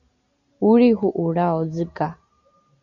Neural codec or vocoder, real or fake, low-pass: none; real; 7.2 kHz